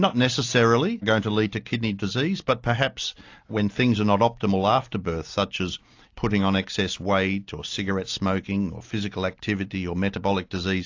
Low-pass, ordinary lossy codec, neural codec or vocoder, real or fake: 7.2 kHz; AAC, 48 kbps; none; real